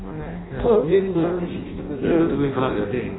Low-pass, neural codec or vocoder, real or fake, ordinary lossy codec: 7.2 kHz; codec, 16 kHz in and 24 kHz out, 0.6 kbps, FireRedTTS-2 codec; fake; AAC, 16 kbps